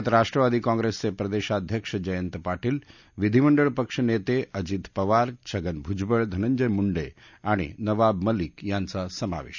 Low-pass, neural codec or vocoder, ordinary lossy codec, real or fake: 7.2 kHz; none; none; real